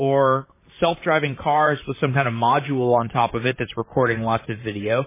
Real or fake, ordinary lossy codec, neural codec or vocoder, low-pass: fake; MP3, 16 kbps; vocoder, 44.1 kHz, 128 mel bands, Pupu-Vocoder; 3.6 kHz